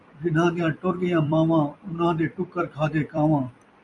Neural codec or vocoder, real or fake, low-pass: vocoder, 44.1 kHz, 128 mel bands every 256 samples, BigVGAN v2; fake; 10.8 kHz